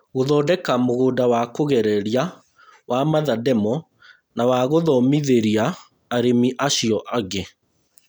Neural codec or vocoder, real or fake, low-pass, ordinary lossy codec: none; real; none; none